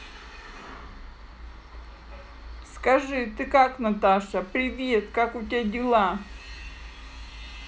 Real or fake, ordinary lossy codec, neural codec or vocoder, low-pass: real; none; none; none